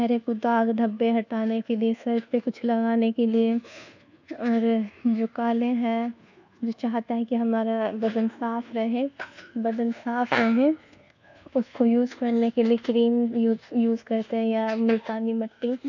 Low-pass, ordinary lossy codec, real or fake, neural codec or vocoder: 7.2 kHz; none; fake; codec, 24 kHz, 1.2 kbps, DualCodec